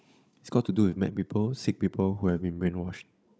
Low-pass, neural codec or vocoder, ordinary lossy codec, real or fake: none; codec, 16 kHz, 16 kbps, FunCodec, trained on Chinese and English, 50 frames a second; none; fake